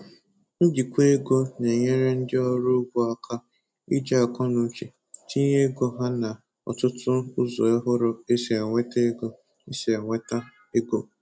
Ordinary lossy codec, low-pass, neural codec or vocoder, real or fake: none; none; none; real